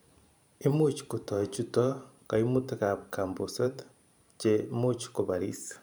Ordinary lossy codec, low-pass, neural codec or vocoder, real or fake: none; none; vocoder, 44.1 kHz, 128 mel bands every 512 samples, BigVGAN v2; fake